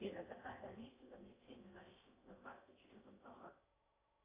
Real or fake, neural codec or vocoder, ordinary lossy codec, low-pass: fake; codec, 16 kHz in and 24 kHz out, 0.6 kbps, FocalCodec, streaming, 4096 codes; AAC, 16 kbps; 3.6 kHz